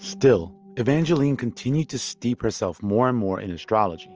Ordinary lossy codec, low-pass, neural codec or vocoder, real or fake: Opus, 24 kbps; 7.2 kHz; none; real